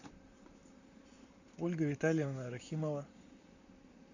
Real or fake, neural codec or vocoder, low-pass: fake; vocoder, 44.1 kHz, 128 mel bands, Pupu-Vocoder; 7.2 kHz